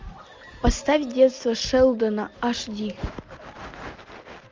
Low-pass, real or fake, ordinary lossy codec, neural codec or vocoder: 7.2 kHz; real; Opus, 32 kbps; none